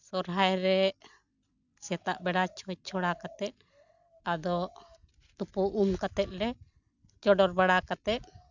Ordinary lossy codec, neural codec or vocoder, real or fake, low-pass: none; none; real; 7.2 kHz